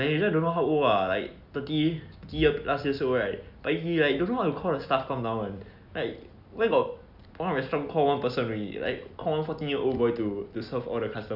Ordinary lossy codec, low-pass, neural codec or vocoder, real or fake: none; 5.4 kHz; none; real